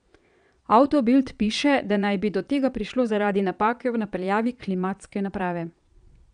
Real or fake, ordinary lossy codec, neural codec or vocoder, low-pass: fake; none; vocoder, 22.05 kHz, 80 mel bands, Vocos; 9.9 kHz